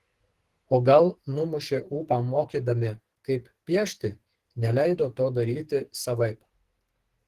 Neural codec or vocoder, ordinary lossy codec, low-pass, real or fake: codec, 44.1 kHz, 2.6 kbps, SNAC; Opus, 16 kbps; 14.4 kHz; fake